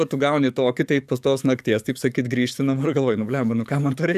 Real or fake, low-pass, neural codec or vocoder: fake; 14.4 kHz; codec, 44.1 kHz, 7.8 kbps, DAC